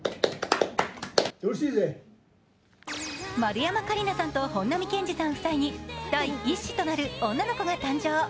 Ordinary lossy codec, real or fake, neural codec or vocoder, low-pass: none; real; none; none